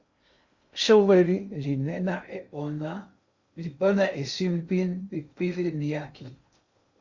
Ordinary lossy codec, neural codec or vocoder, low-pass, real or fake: Opus, 64 kbps; codec, 16 kHz in and 24 kHz out, 0.6 kbps, FocalCodec, streaming, 2048 codes; 7.2 kHz; fake